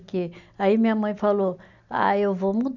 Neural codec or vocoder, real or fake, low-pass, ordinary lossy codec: none; real; 7.2 kHz; none